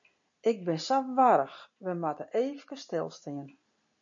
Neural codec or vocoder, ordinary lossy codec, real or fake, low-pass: none; AAC, 64 kbps; real; 7.2 kHz